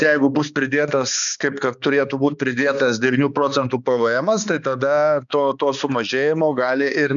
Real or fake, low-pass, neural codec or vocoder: fake; 7.2 kHz; codec, 16 kHz, 2 kbps, X-Codec, HuBERT features, trained on balanced general audio